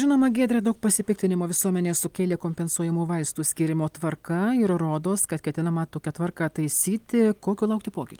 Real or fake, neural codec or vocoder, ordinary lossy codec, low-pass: real; none; Opus, 32 kbps; 19.8 kHz